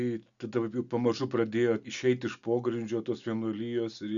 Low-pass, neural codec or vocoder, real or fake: 7.2 kHz; none; real